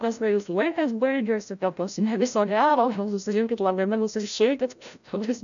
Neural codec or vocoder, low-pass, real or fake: codec, 16 kHz, 0.5 kbps, FreqCodec, larger model; 7.2 kHz; fake